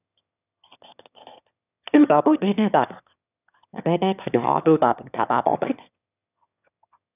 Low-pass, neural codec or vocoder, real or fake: 3.6 kHz; autoencoder, 22.05 kHz, a latent of 192 numbers a frame, VITS, trained on one speaker; fake